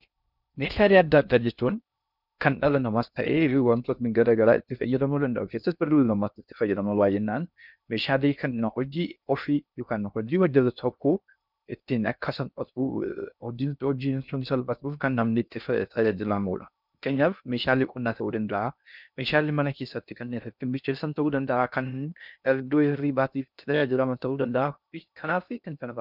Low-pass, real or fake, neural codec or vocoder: 5.4 kHz; fake; codec, 16 kHz in and 24 kHz out, 0.6 kbps, FocalCodec, streaming, 2048 codes